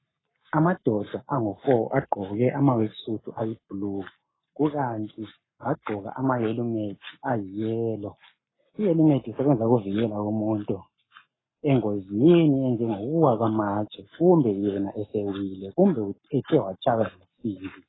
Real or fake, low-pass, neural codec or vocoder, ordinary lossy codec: fake; 7.2 kHz; codec, 44.1 kHz, 7.8 kbps, Pupu-Codec; AAC, 16 kbps